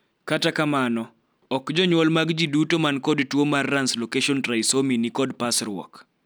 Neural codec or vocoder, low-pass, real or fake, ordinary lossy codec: none; none; real; none